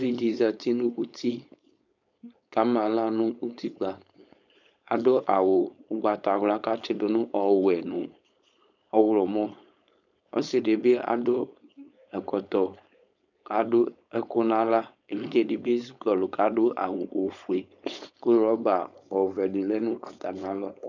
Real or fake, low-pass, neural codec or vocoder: fake; 7.2 kHz; codec, 16 kHz, 4.8 kbps, FACodec